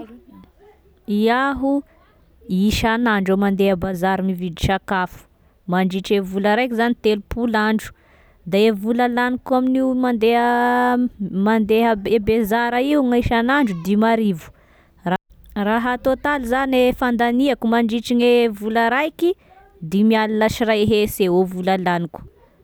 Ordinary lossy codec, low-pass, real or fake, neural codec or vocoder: none; none; real; none